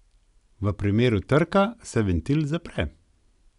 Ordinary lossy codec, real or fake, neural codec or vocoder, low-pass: none; real; none; 10.8 kHz